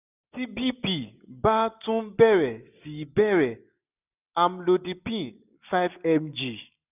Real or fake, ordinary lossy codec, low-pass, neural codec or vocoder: real; none; 3.6 kHz; none